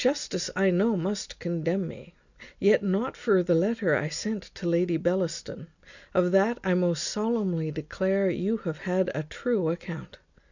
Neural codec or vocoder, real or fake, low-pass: none; real; 7.2 kHz